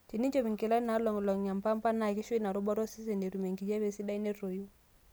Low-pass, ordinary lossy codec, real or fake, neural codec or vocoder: none; none; real; none